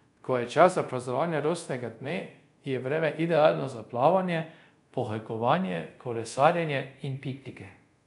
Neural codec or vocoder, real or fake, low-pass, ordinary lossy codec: codec, 24 kHz, 0.5 kbps, DualCodec; fake; 10.8 kHz; none